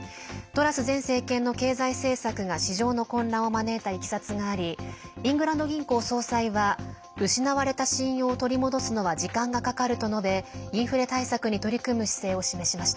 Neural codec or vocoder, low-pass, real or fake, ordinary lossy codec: none; none; real; none